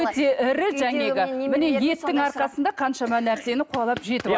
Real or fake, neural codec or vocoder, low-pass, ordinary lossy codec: real; none; none; none